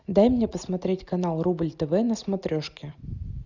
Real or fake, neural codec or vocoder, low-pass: real; none; 7.2 kHz